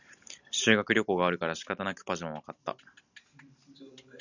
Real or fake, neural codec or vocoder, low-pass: real; none; 7.2 kHz